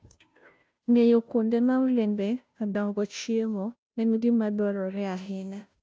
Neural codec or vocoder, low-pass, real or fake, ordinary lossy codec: codec, 16 kHz, 0.5 kbps, FunCodec, trained on Chinese and English, 25 frames a second; none; fake; none